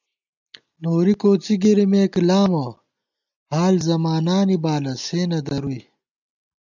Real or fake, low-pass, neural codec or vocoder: real; 7.2 kHz; none